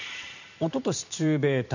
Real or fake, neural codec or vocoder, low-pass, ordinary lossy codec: fake; vocoder, 44.1 kHz, 128 mel bands every 512 samples, BigVGAN v2; 7.2 kHz; none